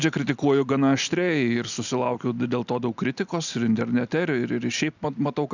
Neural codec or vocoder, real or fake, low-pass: none; real; 7.2 kHz